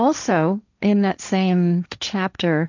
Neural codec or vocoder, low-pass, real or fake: codec, 16 kHz, 1.1 kbps, Voila-Tokenizer; 7.2 kHz; fake